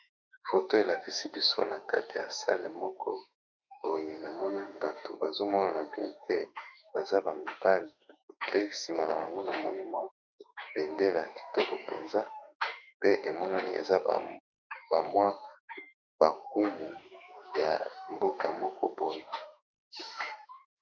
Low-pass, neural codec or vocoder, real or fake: 7.2 kHz; autoencoder, 48 kHz, 32 numbers a frame, DAC-VAE, trained on Japanese speech; fake